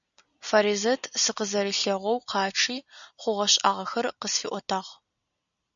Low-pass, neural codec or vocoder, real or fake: 7.2 kHz; none; real